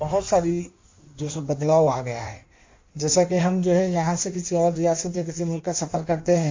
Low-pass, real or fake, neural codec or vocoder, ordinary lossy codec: 7.2 kHz; fake; codec, 16 kHz in and 24 kHz out, 1.1 kbps, FireRedTTS-2 codec; MP3, 48 kbps